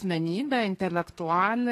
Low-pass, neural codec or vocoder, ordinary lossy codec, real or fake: 14.4 kHz; codec, 32 kHz, 1.9 kbps, SNAC; AAC, 48 kbps; fake